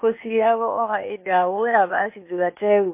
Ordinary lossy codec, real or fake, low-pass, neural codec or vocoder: MP3, 24 kbps; fake; 3.6 kHz; codec, 16 kHz, 0.8 kbps, ZipCodec